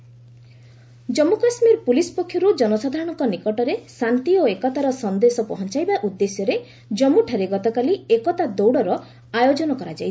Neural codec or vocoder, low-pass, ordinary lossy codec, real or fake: none; none; none; real